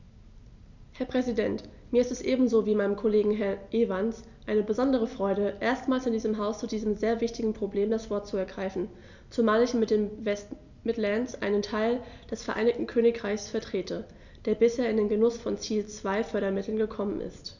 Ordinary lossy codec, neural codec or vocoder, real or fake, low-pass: none; none; real; 7.2 kHz